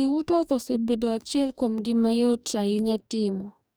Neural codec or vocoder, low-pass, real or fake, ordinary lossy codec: codec, 44.1 kHz, 2.6 kbps, DAC; none; fake; none